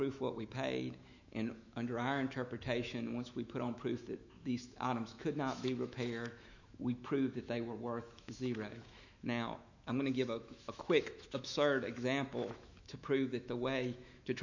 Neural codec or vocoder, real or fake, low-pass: autoencoder, 48 kHz, 128 numbers a frame, DAC-VAE, trained on Japanese speech; fake; 7.2 kHz